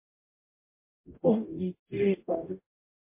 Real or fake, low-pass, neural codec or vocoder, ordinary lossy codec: fake; 3.6 kHz; codec, 44.1 kHz, 0.9 kbps, DAC; MP3, 24 kbps